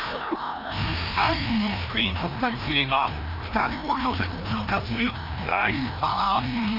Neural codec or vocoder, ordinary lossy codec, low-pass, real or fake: codec, 16 kHz, 1 kbps, FreqCodec, larger model; none; 5.4 kHz; fake